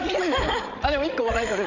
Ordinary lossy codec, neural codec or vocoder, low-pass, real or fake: none; codec, 16 kHz, 16 kbps, FreqCodec, larger model; 7.2 kHz; fake